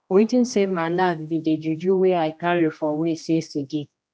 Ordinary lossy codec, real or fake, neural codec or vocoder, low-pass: none; fake; codec, 16 kHz, 1 kbps, X-Codec, HuBERT features, trained on general audio; none